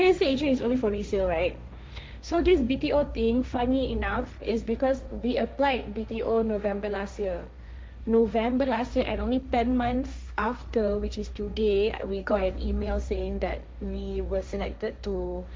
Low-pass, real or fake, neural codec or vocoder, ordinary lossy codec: none; fake; codec, 16 kHz, 1.1 kbps, Voila-Tokenizer; none